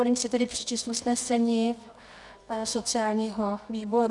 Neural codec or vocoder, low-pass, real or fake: codec, 24 kHz, 0.9 kbps, WavTokenizer, medium music audio release; 10.8 kHz; fake